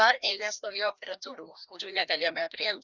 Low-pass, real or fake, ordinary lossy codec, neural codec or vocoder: 7.2 kHz; fake; Opus, 64 kbps; codec, 16 kHz, 1 kbps, FreqCodec, larger model